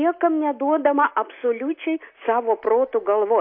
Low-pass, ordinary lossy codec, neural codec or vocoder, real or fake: 5.4 kHz; MP3, 32 kbps; none; real